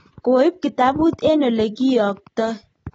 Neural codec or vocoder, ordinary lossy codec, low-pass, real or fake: none; AAC, 24 kbps; 19.8 kHz; real